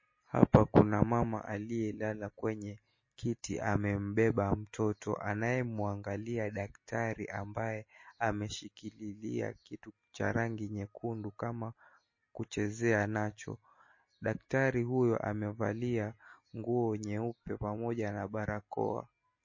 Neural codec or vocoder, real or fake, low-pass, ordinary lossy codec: none; real; 7.2 kHz; MP3, 32 kbps